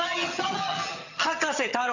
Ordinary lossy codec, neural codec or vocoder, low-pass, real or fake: none; vocoder, 22.05 kHz, 80 mel bands, HiFi-GAN; 7.2 kHz; fake